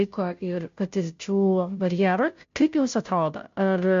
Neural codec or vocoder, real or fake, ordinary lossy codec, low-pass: codec, 16 kHz, 0.5 kbps, FunCodec, trained on Chinese and English, 25 frames a second; fake; MP3, 48 kbps; 7.2 kHz